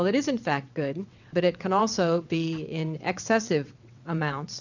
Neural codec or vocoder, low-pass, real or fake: vocoder, 22.05 kHz, 80 mel bands, WaveNeXt; 7.2 kHz; fake